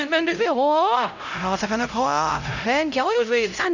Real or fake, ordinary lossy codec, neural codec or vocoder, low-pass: fake; none; codec, 16 kHz, 0.5 kbps, X-Codec, HuBERT features, trained on LibriSpeech; 7.2 kHz